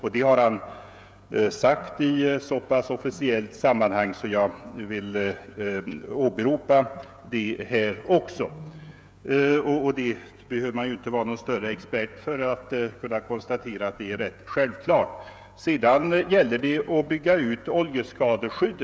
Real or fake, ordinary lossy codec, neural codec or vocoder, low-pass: fake; none; codec, 16 kHz, 16 kbps, FreqCodec, smaller model; none